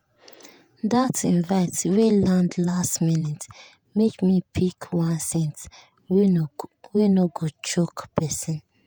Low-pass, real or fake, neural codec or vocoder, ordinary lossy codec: none; fake; vocoder, 48 kHz, 128 mel bands, Vocos; none